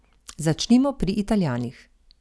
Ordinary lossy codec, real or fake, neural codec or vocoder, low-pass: none; real; none; none